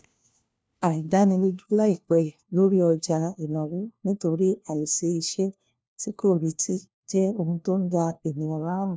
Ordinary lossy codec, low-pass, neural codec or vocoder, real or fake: none; none; codec, 16 kHz, 1 kbps, FunCodec, trained on LibriTTS, 50 frames a second; fake